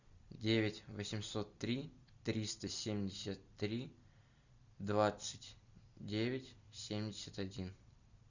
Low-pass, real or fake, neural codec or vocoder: 7.2 kHz; fake; vocoder, 44.1 kHz, 128 mel bands every 256 samples, BigVGAN v2